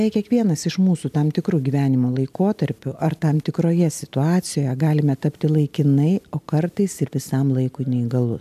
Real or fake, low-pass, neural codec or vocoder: real; 14.4 kHz; none